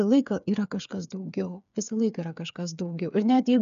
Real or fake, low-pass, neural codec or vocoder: fake; 7.2 kHz; codec, 16 kHz, 8 kbps, FreqCodec, smaller model